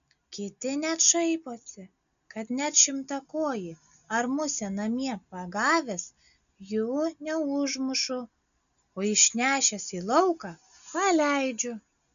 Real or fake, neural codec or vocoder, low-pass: real; none; 7.2 kHz